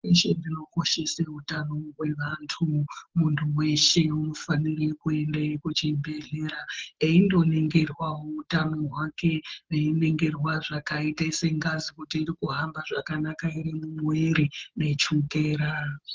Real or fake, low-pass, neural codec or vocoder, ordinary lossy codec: real; 7.2 kHz; none; Opus, 16 kbps